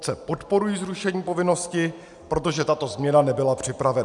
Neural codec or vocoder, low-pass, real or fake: none; 10.8 kHz; real